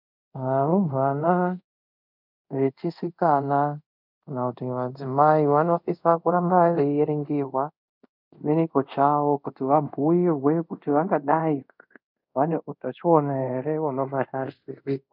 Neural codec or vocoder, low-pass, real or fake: codec, 24 kHz, 0.5 kbps, DualCodec; 5.4 kHz; fake